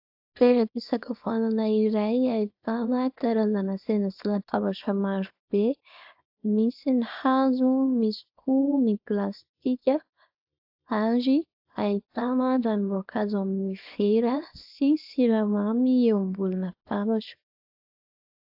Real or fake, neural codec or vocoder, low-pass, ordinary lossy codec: fake; codec, 24 kHz, 0.9 kbps, WavTokenizer, small release; 5.4 kHz; AAC, 48 kbps